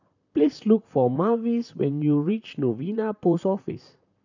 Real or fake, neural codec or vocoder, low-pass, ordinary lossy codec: fake; vocoder, 44.1 kHz, 128 mel bands, Pupu-Vocoder; 7.2 kHz; none